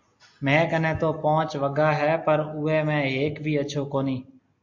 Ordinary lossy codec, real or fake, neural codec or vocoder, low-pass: MP3, 48 kbps; real; none; 7.2 kHz